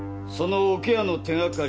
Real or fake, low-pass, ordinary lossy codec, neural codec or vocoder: real; none; none; none